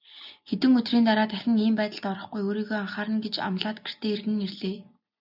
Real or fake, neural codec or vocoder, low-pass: real; none; 5.4 kHz